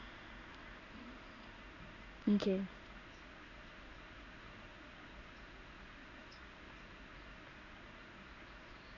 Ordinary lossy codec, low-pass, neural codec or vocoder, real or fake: none; 7.2 kHz; codec, 16 kHz in and 24 kHz out, 1 kbps, XY-Tokenizer; fake